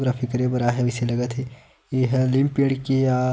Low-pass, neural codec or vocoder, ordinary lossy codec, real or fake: none; none; none; real